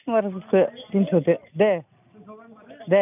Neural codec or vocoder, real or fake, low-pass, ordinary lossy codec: none; real; 3.6 kHz; none